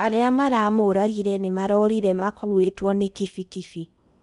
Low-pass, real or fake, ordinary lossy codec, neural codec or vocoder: 10.8 kHz; fake; none; codec, 16 kHz in and 24 kHz out, 0.8 kbps, FocalCodec, streaming, 65536 codes